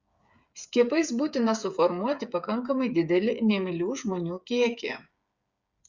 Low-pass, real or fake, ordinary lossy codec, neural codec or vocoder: 7.2 kHz; fake; Opus, 64 kbps; codec, 16 kHz, 8 kbps, FreqCodec, smaller model